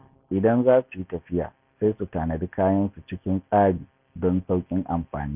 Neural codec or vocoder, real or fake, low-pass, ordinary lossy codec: none; real; 3.6 kHz; Opus, 64 kbps